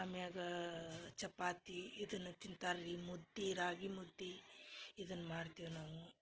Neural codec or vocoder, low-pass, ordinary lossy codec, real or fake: none; 7.2 kHz; Opus, 16 kbps; real